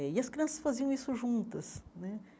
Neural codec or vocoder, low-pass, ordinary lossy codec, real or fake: none; none; none; real